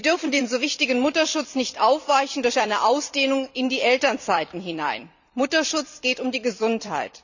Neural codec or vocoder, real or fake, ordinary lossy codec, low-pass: vocoder, 44.1 kHz, 128 mel bands every 256 samples, BigVGAN v2; fake; none; 7.2 kHz